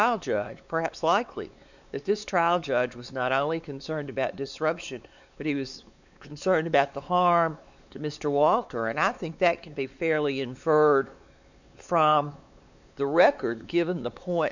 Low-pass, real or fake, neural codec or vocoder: 7.2 kHz; fake; codec, 16 kHz, 4 kbps, X-Codec, WavLM features, trained on Multilingual LibriSpeech